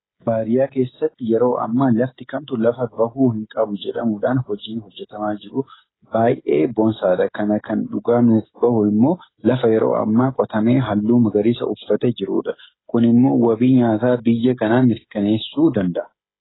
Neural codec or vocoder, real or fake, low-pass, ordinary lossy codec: codec, 16 kHz, 16 kbps, FreqCodec, smaller model; fake; 7.2 kHz; AAC, 16 kbps